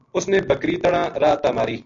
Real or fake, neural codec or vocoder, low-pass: real; none; 7.2 kHz